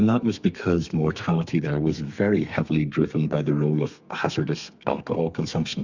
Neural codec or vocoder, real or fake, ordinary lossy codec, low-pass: codec, 32 kHz, 1.9 kbps, SNAC; fake; Opus, 64 kbps; 7.2 kHz